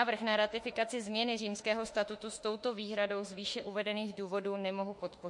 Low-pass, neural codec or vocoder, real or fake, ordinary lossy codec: 10.8 kHz; autoencoder, 48 kHz, 32 numbers a frame, DAC-VAE, trained on Japanese speech; fake; MP3, 48 kbps